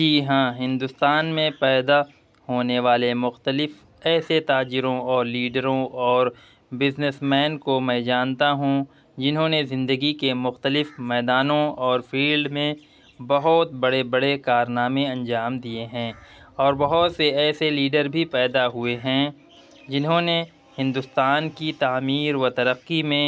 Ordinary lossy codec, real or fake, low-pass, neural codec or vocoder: none; real; none; none